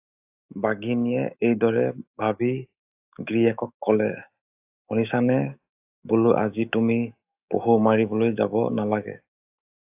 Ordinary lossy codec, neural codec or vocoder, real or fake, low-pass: AAC, 32 kbps; autoencoder, 48 kHz, 128 numbers a frame, DAC-VAE, trained on Japanese speech; fake; 3.6 kHz